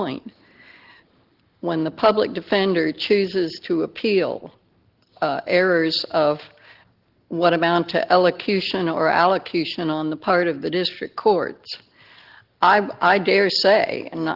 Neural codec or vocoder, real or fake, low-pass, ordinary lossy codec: none; real; 5.4 kHz; Opus, 16 kbps